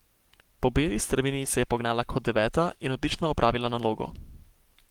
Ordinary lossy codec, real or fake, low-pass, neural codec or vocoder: Opus, 24 kbps; fake; 19.8 kHz; codec, 44.1 kHz, 7.8 kbps, Pupu-Codec